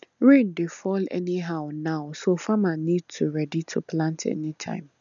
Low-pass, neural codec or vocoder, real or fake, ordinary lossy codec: 7.2 kHz; none; real; none